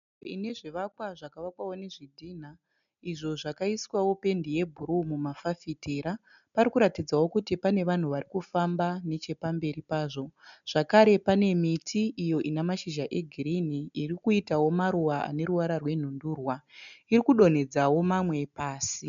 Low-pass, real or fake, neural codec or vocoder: 7.2 kHz; real; none